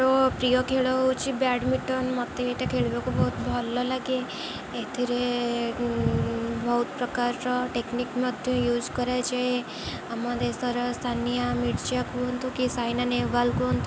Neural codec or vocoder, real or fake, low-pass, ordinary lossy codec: none; real; none; none